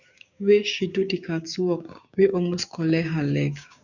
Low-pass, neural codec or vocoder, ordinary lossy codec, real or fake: 7.2 kHz; codec, 16 kHz, 6 kbps, DAC; none; fake